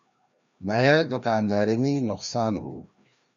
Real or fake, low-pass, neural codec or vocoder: fake; 7.2 kHz; codec, 16 kHz, 1 kbps, FreqCodec, larger model